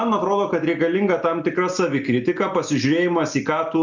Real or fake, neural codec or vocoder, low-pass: real; none; 7.2 kHz